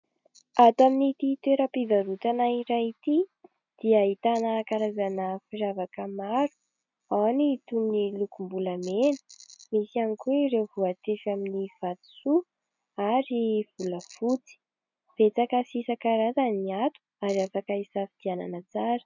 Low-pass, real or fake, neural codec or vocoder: 7.2 kHz; real; none